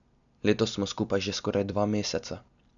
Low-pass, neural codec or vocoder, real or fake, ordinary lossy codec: 7.2 kHz; none; real; none